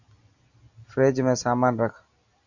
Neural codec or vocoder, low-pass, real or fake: none; 7.2 kHz; real